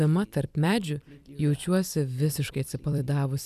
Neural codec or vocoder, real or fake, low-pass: vocoder, 44.1 kHz, 128 mel bands every 256 samples, BigVGAN v2; fake; 14.4 kHz